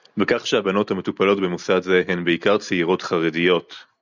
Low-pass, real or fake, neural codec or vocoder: 7.2 kHz; real; none